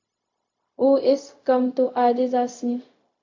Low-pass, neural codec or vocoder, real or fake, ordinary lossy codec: 7.2 kHz; codec, 16 kHz, 0.4 kbps, LongCat-Audio-Codec; fake; MP3, 48 kbps